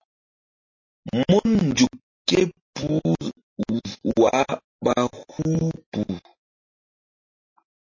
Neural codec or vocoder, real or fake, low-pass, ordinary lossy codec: none; real; 7.2 kHz; MP3, 32 kbps